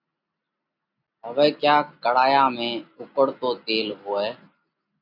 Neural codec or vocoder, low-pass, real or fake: none; 5.4 kHz; real